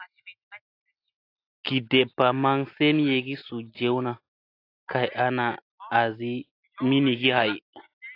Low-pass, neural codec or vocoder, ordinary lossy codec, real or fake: 5.4 kHz; none; AAC, 32 kbps; real